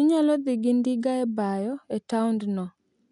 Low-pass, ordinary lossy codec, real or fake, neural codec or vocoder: 10.8 kHz; none; real; none